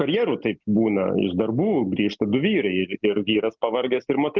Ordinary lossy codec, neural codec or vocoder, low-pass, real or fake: Opus, 24 kbps; none; 7.2 kHz; real